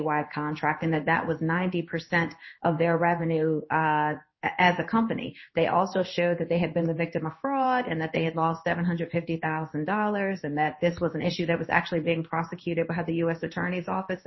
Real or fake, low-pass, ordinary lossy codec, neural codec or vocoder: fake; 7.2 kHz; MP3, 24 kbps; codec, 16 kHz in and 24 kHz out, 1 kbps, XY-Tokenizer